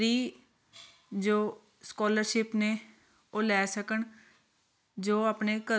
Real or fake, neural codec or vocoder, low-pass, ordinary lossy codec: real; none; none; none